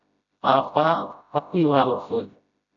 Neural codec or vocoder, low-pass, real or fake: codec, 16 kHz, 0.5 kbps, FreqCodec, smaller model; 7.2 kHz; fake